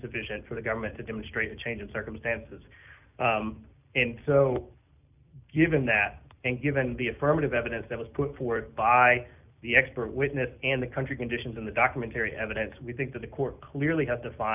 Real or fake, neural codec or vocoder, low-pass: real; none; 3.6 kHz